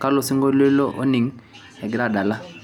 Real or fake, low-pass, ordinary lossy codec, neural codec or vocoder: real; 19.8 kHz; none; none